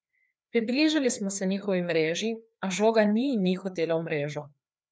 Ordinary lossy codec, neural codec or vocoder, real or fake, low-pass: none; codec, 16 kHz, 2 kbps, FreqCodec, larger model; fake; none